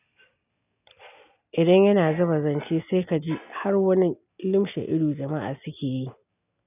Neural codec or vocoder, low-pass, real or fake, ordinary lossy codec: none; 3.6 kHz; real; none